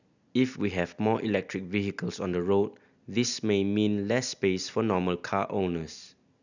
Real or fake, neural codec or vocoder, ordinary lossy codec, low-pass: real; none; none; 7.2 kHz